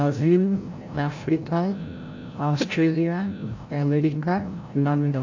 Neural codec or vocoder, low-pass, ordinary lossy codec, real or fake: codec, 16 kHz, 0.5 kbps, FreqCodec, larger model; 7.2 kHz; AAC, 48 kbps; fake